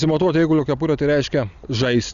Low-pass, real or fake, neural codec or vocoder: 7.2 kHz; real; none